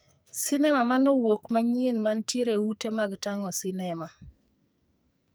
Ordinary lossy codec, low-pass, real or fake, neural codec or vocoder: none; none; fake; codec, 44.1 kHz, 2.6 kbps, SNAC